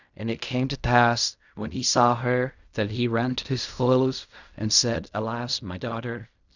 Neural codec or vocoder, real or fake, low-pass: codec, 16 kHz in and 24 kHz out, 0.4 kbps, LongCat-Audio-Codec, fine tuned four codebook decoder; fake; 7.2 kHz